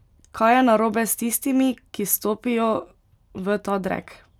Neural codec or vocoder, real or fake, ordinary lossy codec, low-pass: none; real; none; 19.8 kHz